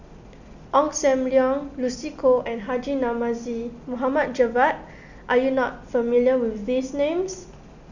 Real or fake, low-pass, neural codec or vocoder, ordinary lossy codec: real; 7.2 kHz; none; none